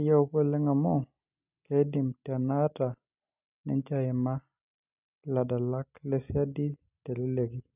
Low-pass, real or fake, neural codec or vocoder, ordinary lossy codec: 3.6 kHz; real; none; none